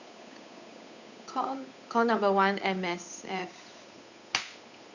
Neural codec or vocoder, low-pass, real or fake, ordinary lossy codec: codec, 16 kHz, 8 kbps, FunCodec, trained on Chinese and English, 25 frames a second; 7.2 kHz; fake; none